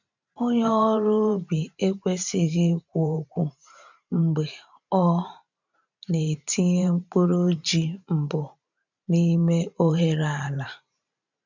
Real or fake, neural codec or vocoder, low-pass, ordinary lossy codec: fake; vocoder, 44.1 kHz, 128 mel bands every 512 samples, BigVGAN v2; 7.2 kHz; none